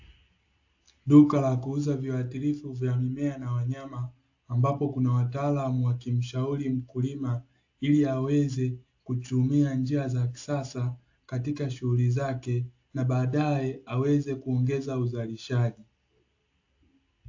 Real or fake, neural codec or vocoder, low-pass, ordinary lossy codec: real; none; 7.2 kHz; AAC, 48 kbps